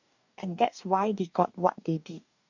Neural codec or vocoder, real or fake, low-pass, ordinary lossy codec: codec, 44.1 kHz, 2.6 kbps, DAC; fake; 7.2 kHz; none